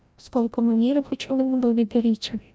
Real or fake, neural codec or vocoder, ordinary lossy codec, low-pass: fake; codec, 16 kHz, 0.5 kbps, FreqCodec, larger model; none; none